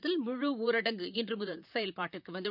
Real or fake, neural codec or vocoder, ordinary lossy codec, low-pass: fake; vocoder, 44.1 kHz, 128 mel bands, Pupu-Vocoder; none; 5.4 kHz